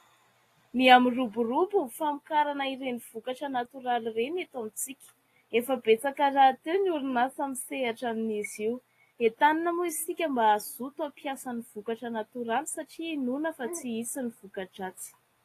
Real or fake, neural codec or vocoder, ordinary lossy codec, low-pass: real; none; AAC, 48 kbps; 14.4 kHz